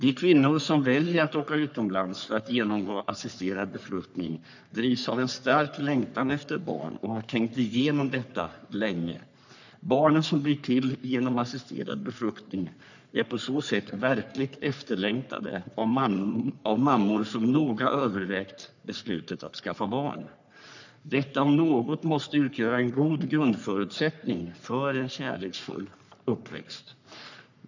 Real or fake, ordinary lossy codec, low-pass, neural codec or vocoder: fake; none; 7.2 kHz; codec, 44.1 kHz, 3.4 kbps, Pupu-Codec